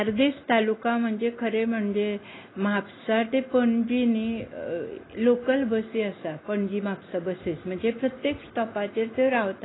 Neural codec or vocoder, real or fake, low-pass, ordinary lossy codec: none; real; 7.2 kHz; AAC, 16 kbps